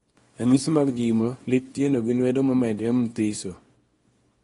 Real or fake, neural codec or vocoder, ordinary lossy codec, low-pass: fake; codec, 24 kHz, 0.9 kbps, WavTokenizer, small release; AAC, 32 kbps; 10.8 kHz